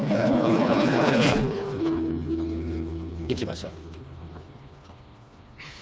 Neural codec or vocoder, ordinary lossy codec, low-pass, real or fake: codec, 16 kHz, 2 kbps, FreqCodec, smaller model; none; none; fake